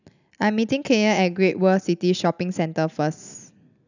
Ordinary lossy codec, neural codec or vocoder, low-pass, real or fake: none; none; 7.2 kHz; real